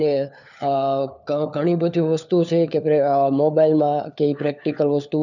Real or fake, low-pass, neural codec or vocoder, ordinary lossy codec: fake; 7.2 kHz; codec, 16 kHz, 4 kbps, FunCodec, trained on LibriTTS, 50 frames a second; none